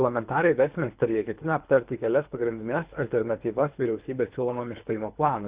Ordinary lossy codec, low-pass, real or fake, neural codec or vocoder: Opus, 64 kbps; 3.6 kHz; fake; codec, 24 kHz, 3 kbps, HILCodec